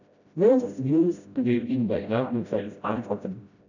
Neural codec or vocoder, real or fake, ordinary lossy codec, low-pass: codec, 16 kHz, 0.5 kbps, FreqCodec, smaller model; fake; none; 7.2 kHz